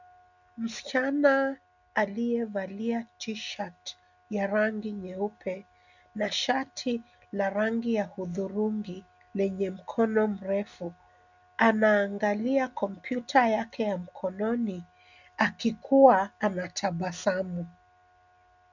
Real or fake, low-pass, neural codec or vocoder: real; 7.2 kHz; none